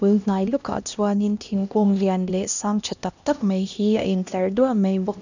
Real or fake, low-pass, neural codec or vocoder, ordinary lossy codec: fake; 7.2 kHz; codec, 16 kHz, 1 kbps, X-Codec, HuBERT features, trained on LibriSpeech; none